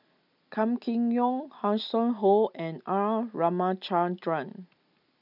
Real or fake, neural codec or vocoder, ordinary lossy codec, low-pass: real; none; none; 5.4 kHz